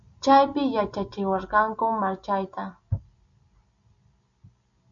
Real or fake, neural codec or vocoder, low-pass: real; none; 7.2 kHz